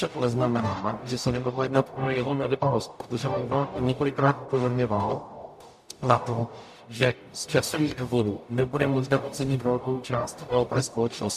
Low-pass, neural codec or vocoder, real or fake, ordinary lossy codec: 14.4 kHz; codec, 44.1 kHz, 0.9 kbps, DAC; fake; AAC, 96 kbps